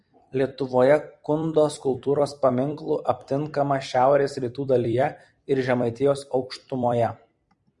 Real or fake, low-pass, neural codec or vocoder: fake; 10.8 kHz; vocoder, 44.1 kHz, 128 mel bands every 256 samples, BigVGAN v2